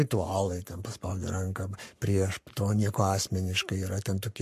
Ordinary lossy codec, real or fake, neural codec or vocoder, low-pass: MP3, 64 kbps; fake; codec, 44.1 kHz, 7.8 kbps, Pupu-Codec; 14.4 kHz